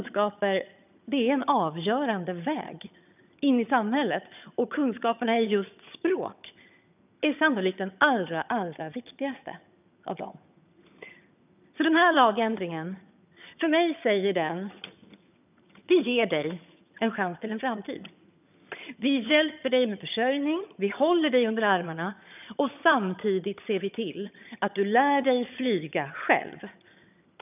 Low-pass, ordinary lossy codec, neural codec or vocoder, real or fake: 3.6 kHz; none; vocoder, 22.05 kHz, 80 mel bands, HiFi-GAN; fake